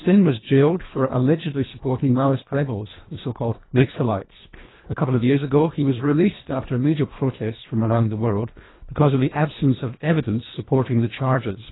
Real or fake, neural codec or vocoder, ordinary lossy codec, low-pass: fake; codec, 24 kHz, 1.5 kbps, HILCodec; AAC, 16 kbps; 7.2 kHz